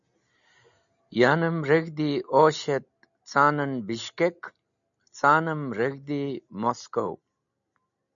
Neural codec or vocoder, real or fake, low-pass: none; real; 7.2 kHz